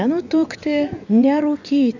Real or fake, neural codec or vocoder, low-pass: fake; vocoder, 24 kHz, 100 mel bands, Vocos; 7.2 kHz